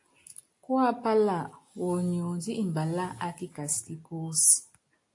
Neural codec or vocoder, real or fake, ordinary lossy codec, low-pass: none; real; AAC, 48 kbps; 10.8 kHz